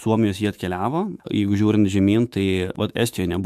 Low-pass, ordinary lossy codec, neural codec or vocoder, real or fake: 14.4 kHz; MP3, 96 kbps; autoencoder, 48 kHz, 128 numbers a frame, DAC-VAE, trained on Japanese speech; fake